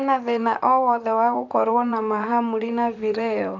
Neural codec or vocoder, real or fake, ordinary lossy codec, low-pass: vocoder, 44.1 kHz, 128 mel bands, Pupu-Vocoder; fake; none; 7.2 kHz